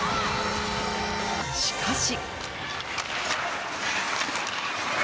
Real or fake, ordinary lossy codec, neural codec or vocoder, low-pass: real; none; none; none